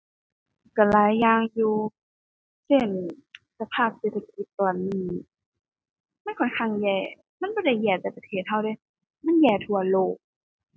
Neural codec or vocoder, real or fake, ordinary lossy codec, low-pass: none; real; none; none